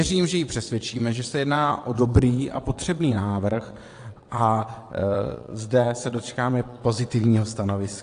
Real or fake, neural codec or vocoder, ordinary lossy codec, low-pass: fake; vocoder, 22.05 kHz, 80 mel bands, WaveNeXt; AAC, 48 kbps; 9.9 kHz